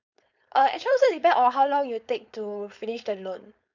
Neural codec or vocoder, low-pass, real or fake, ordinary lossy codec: codec, 16 kHz, 4.8 kbps, FACodec; 7.2 kHz; fake; none